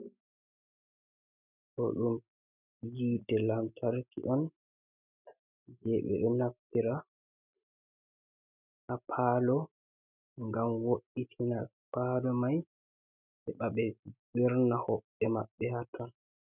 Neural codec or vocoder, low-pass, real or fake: none; 3.6 kHz; real